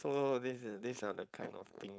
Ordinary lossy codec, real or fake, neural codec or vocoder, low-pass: none; fake; codec, 16 kHz, 4.8 kbps, FACodec; none